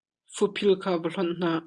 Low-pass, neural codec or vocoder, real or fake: 9.9 kHz; none; real